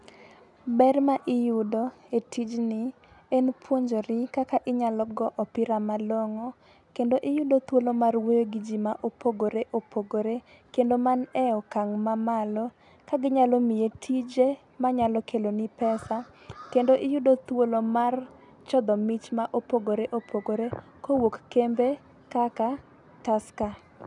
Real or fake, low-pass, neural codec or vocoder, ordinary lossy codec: real; 10.8 kHz; none; none